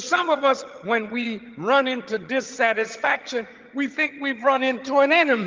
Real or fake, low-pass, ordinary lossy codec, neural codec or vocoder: fake; 7.2 kHz; Opus, 32 kbps; vocoder, 22.05 kHz, 80 mel bands, HiFi-GAN